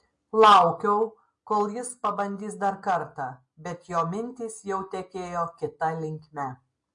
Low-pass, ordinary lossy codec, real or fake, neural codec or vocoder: 10.8 kHz; MP3, 48 kbps; real; none